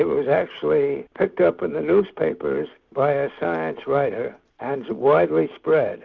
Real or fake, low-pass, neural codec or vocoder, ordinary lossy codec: real; 7.2 kHz; none; Opus, 64 kbps